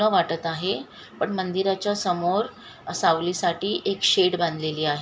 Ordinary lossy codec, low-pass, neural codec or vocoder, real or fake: none; none; none; real